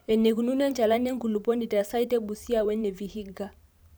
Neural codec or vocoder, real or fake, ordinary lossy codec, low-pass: vocoder, 44.1 kHz, 128 mel bands every 512 samples, BigVGAN v2; fake; none; none